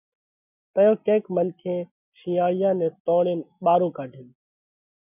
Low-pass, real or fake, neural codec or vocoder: 3.6 kHz; real; none